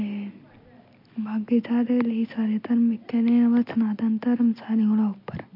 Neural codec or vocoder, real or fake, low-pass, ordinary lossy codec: none; real; 5.4 kHz; MP3, 32 kbps